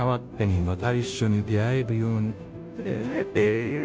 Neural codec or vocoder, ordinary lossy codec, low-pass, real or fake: codec, 16 kHz, 0.5 kbps, FunCodec, trained on Chinese and English, 25 frames a second; none; none; fake